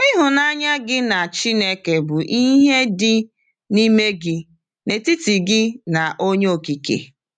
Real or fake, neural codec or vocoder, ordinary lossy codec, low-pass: real; none; none; 9.9 kHz